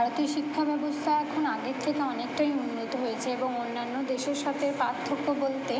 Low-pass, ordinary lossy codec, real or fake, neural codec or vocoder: none; none; real; none